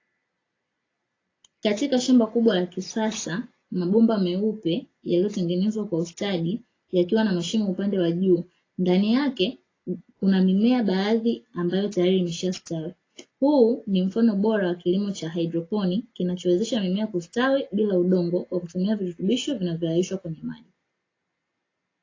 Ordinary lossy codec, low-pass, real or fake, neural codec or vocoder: AAC, 32 kbps; 7.2 kHz; real; none